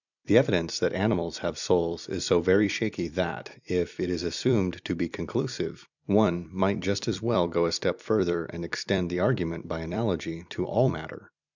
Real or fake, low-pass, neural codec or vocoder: fake; 7.2 kHz; vocoder, 44.1 kHz, 128 mel bands every 256 samples, BigVGAN v2